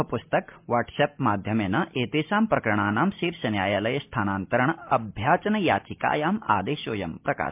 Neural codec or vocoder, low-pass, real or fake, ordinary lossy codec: none; 3.6 kHz; real; MP3, 32 kbps